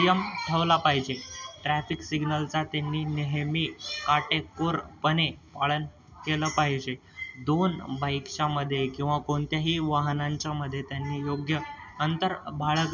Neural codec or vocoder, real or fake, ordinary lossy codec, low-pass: none; real; none; 7.2 kHz